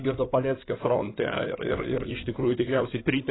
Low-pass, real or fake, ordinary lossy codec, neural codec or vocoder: 7.2 kHz; fake; AAC, 16 kbps; codec, 16 kHz in and 24 kHz out, 2.2 kbps, FireRedTTS-2 codec